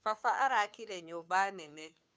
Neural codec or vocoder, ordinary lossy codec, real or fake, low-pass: codec, 16 kHz, 2 kbps, FunCodec, trained on Chinese and English, 25 frames a second; none; fake; none